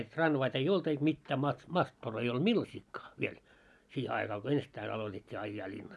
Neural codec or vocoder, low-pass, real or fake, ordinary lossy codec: none; none; real; none